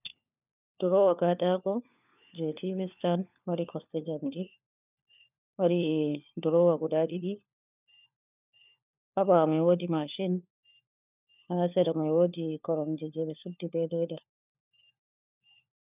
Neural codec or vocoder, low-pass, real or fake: codec, 16 kHz, 4 kbps, FunCodec, trained on LibriTTS, 50 frames a second; 3.6 kHz; fake